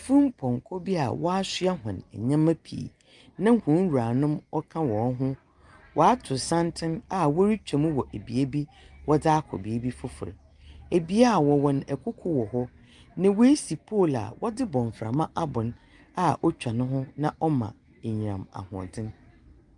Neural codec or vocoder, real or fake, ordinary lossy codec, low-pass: none; real; Opus, 32 kbps; 10.8 kHz